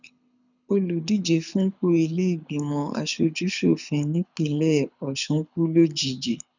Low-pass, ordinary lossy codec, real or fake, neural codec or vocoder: 7.2 kHz; none; fake; codec, 24 kHz, 6 kbps, HILCodec